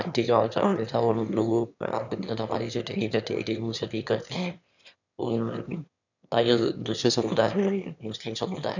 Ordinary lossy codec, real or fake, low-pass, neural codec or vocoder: none; fake; 7.2 kHz; autoencoder, 22.05 kHz, a latent of 192 numbers a frame, VITS, trained on one speaker